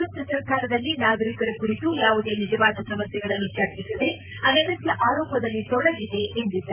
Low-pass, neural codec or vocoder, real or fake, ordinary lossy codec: 3.6 kHz; vocoder, 44.1 kHz, 128 mel bands, Pupu-Vocoder; fake; none